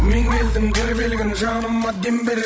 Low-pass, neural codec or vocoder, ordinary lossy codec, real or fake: none; codec, 16 kHz, 16 kbps, FreqCodec, larger model; none; fake